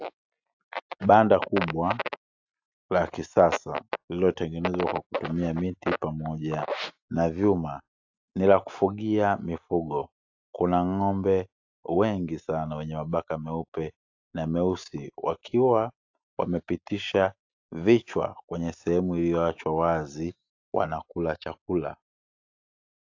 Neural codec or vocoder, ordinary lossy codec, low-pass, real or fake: none; AAC, 48 kbps; 7.2 kHz; real